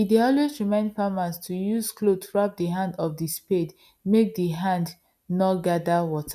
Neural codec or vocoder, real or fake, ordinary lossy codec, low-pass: none; real; none; 14.4 kHz